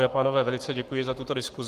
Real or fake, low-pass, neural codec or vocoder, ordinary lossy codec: fake; 14.4 kHz; codec, 44.1 kHz, 7.8 kbps, Pupu-Codec; Opus, 24 kbps